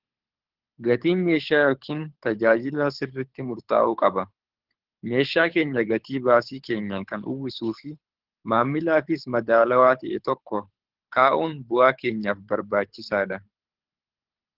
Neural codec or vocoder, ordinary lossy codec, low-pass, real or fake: codec, 24 kHz, 6 kbps, HILCodec; Opus, 16 kbps; 5.4 kHz; fake